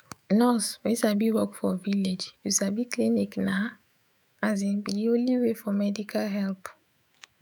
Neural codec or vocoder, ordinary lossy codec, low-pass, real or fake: autoencoder, 48 kHz, 128 numbers a frame, DAC-VAE, trained on Japanese speech; none; none; fake